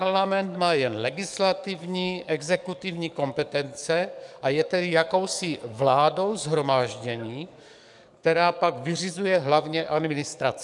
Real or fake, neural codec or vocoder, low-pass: fake; codec, 44.1 kHz, 7.8 kbps, DAC; 10.8 kHz